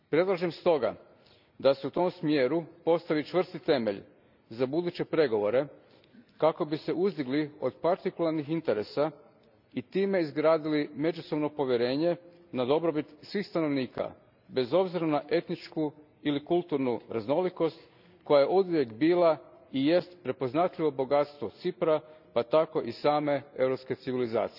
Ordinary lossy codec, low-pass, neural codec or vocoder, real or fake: none; 5.4 kHz; none; real